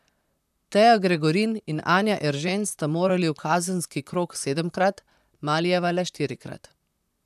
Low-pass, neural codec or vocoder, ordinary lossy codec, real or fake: 14.4 kHz; vocoder, 44.1 kHz, 128 mel bands, Pupu-Vocoder; none; fake